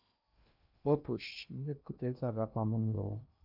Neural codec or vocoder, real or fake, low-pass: codec, 16 kHz in and 24 kHz out, 0.8 kbps, FocalCodec, streaming, 65536 codes; fake; 5.4 kHz